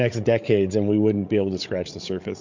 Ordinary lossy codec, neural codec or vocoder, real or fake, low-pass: MP3, 64 kbps; codec, 16 kHz, 8 kbps, FreqCodec, larger model; fake; 7.2 kHz